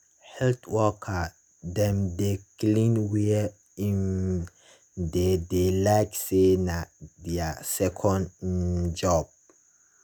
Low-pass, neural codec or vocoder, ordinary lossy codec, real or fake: none; none; none; real